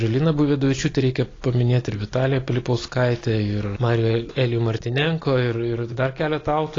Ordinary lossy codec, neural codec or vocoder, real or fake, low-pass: AAC, 32 kbps; none; real; 7.2 kHz